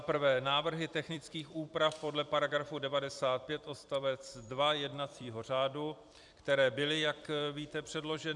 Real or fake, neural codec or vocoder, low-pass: fake; vocoder, 44.1 kHz, 128 mel bands every 256 samples, BigVGAN v2; 10.8 kHz